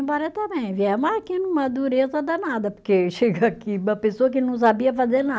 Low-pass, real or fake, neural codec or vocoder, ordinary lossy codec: none; real; none; none